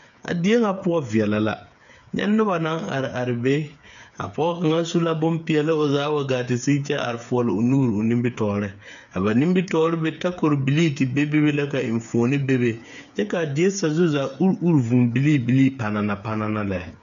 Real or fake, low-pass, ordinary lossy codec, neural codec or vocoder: fake; 7.2 kHz; MP3, 96 kbps; codec, 16 kHz, 8 kbps, FreqCodec, smaller model